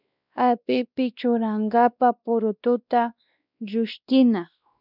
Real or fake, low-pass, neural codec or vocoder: fake; 5.4 kHz; codec, 16 kHz, 1 kbps, X-Codec, WavLM features, trained on Multilingual LibriSpeech